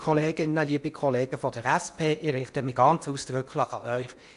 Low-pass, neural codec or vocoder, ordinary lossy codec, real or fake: 10.8 kHz; codec, 16 kHz in and 24 kHz out, 0.8 kbps, FocalCodec, streaming, 65536 codes; none; fake